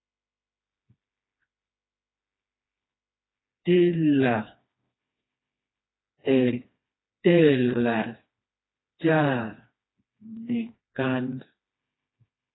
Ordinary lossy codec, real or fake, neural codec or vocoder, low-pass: AAC, 16 kbps; fake; codec, 16 kHz, 2 kbps, FreqCodec, smaller model; 7.2 kHz